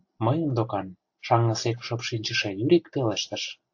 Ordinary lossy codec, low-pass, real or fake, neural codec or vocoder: AAC, 48 kbps; 7.2 kHz; real; none